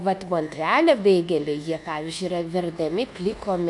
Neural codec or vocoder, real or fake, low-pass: codec, 24 kHz, 1.2 kbps, DualCodec; fake; 10.8 kHz